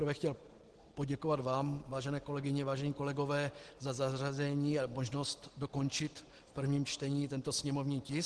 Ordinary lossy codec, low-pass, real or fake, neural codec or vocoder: Opus, 16 kbps; 10.8 kHz; real; none